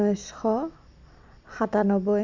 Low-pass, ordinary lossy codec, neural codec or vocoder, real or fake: 7.2 kHz; MP3, 64 kbps; none; real